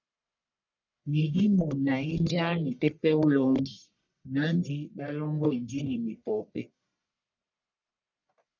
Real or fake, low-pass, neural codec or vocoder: fake; 7.2 kHz; codec, 44.1 kHz, 1.7 kbps, Pupu-Codec